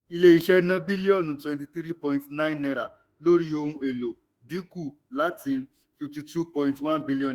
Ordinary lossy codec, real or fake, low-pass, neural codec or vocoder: Opus, 64 kbps; fake; 19.8 kHz; autoencoder, 48 kHz, 32 numbers a frame, DAC-VAE, trained on Japanese speech